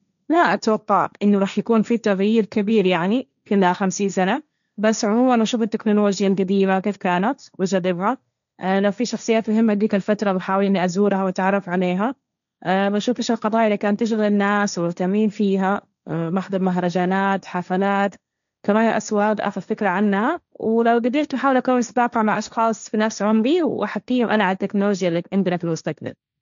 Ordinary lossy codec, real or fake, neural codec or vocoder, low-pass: none; fake; codec, 16 kHz, 1.1 kbps, Voila-Tokenizer; 7.2 kHz